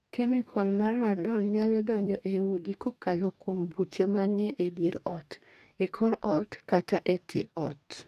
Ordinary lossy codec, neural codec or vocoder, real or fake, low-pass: none; codec, 44.1 kHz, 2.6 kbps, DAC; fake; 14.4 kHz